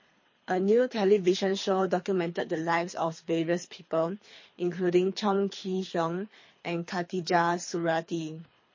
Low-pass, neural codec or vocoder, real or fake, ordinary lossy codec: 7.2 kHz; codec, 24 kHz, 3 kbps, HILCodec; fake; MP3, 32 kbps